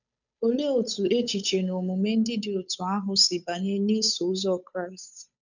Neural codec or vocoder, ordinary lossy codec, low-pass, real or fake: codec, 16 kHz, 8 kbps, FunCodec, trained on Chinese and English, 25 frames a second; none; 7.2 kHz; fake